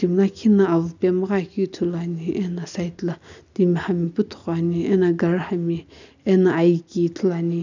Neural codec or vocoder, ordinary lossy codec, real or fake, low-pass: none; none; real; 7.2 kHz